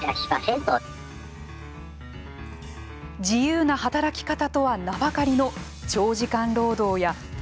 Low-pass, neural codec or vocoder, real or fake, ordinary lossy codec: none; none; real; none